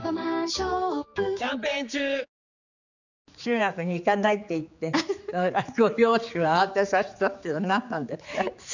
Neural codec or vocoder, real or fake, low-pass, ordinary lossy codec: codec, 16 kHz, 4 kbps, X-Codec, HuBERT features, trained on general audio; fake; 7.2 kHz; none